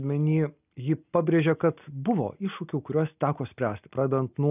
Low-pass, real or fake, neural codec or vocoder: 3.6 kHz; real; none